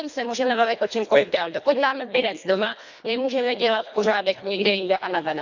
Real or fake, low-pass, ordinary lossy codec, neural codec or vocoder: fake; 7.2 kHz; none; codec, 24 kHz, 1.5 kbps, HILCodec